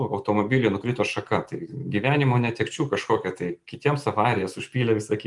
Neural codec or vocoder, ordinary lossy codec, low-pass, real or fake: none; Opus, 32 kbps; 10.8 kHz; real